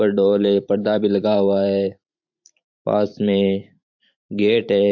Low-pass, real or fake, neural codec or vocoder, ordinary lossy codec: 7.2 kHz; fake; vocoder, 44.1 kHz, 128 mel bands every 512 samples, BigVGAN v2; MP3, 48 kbps